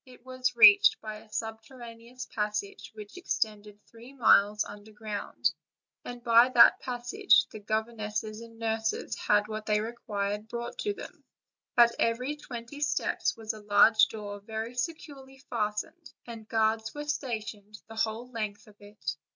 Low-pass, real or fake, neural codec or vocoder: 7.2 kHz; real; none